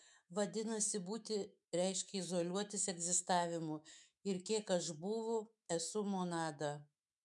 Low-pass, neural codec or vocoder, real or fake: 10.8 kHz; autoencoder, 48 kHz, 128 numbers a frame, DAC-VAE, trained on Japanese speech; fake